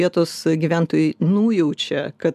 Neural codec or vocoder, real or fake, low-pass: none; real; 14.4 kHz